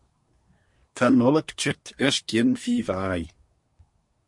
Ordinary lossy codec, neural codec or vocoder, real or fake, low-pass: MP3, 48 kbps; codec, 24 kHz, 1 kbps, SNAC; fake; 10.8 kHz